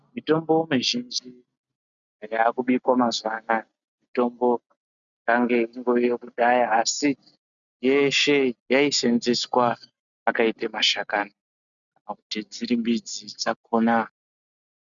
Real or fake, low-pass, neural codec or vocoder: real; 7.2 kHz; none